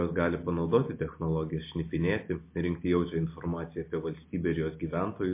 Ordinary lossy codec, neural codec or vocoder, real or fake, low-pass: MP3, 24 kbps; none; real; 3.6 kHz